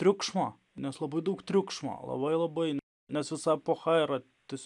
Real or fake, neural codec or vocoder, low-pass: real; none; 10.8 kHz